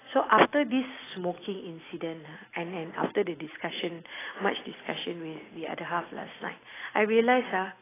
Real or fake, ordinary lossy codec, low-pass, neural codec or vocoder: real; AAC, 16 kbps; 3.6 kHz; none